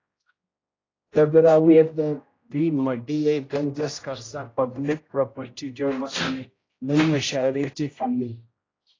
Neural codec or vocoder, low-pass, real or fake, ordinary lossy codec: codec, 16 kHz, 0.5 kbps, X-Codec, HuBERT features, trained on general audio; 7.2 kHz; fake; AAC, 32 kbps